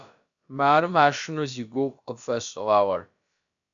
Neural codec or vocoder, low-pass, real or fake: codec, 16 kHz, about 1 kbps, DyCAST, with the encoder's durations; 7.2 kHz; fake